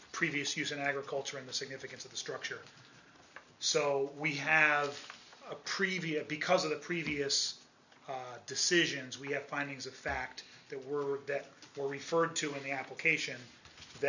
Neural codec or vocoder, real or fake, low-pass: none; real; 7.2 kHz